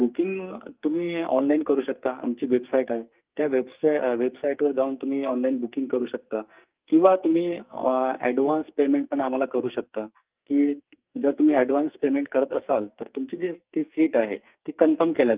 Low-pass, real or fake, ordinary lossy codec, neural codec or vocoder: 3.6 kHz; fake; Opus, 32 kbps; codec, 44.1 kHz, 2.6 kbps, SNAC